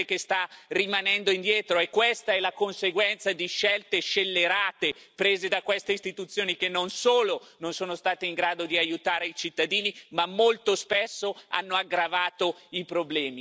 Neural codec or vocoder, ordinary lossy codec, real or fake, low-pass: none; none; real; none